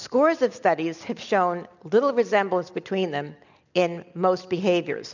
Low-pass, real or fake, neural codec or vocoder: 7.2 kHz; real; none